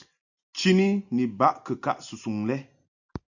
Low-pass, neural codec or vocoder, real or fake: 7.2 kHz; none; real